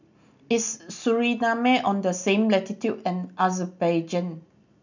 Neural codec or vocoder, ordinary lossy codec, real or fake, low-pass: none; none; real; 7.2 kHz